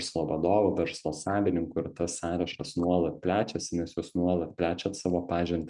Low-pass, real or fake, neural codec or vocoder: 10.8 kHz; real; none